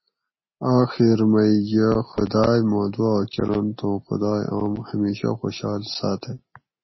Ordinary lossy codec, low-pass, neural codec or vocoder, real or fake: MP3, 24 kbps; 7.2 kHz; none; real